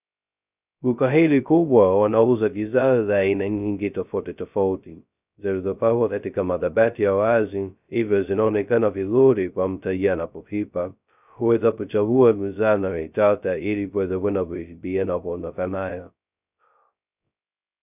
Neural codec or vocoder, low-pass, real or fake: codec, 16 kHz, 0.2 kbps, FocalCodec; 3.6 kHz; fake